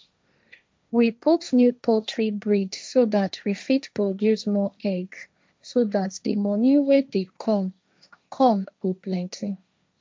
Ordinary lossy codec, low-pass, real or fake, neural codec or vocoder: none; none; fake; codec, 16 kHz, 1.1 kbps, Voila-Tokenizer